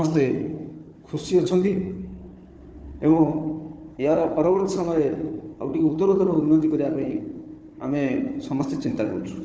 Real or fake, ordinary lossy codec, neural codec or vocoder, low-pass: fake; none; codec, 16 kHz, 4 kbps, FunCodec, trained on Chinese and English, 50 frames a second; none